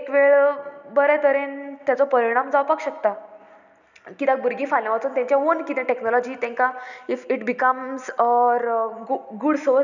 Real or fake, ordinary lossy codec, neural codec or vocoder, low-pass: real; none; none; 7.2 kHz